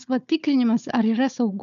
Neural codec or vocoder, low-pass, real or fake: codec, 16 kHz, 4 kbps, FunCodec, trained on Chinese and English, 50 frames a second; 7.2 kHz; fake